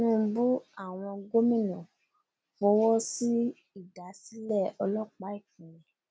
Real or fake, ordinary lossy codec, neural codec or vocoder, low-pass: real; none; none; none